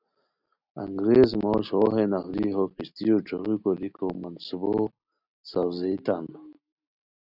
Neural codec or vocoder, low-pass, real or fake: none; 5.4 kHz; real